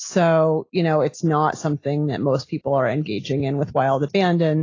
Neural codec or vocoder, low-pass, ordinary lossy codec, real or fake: none; 7.2 kHz; AAC, 32 kbps; real